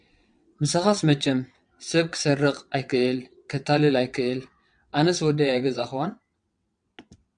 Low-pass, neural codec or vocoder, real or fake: 9.9 kHz; vocoder, 22.05 kHz, 80 mel bands, WaveNeXt; fake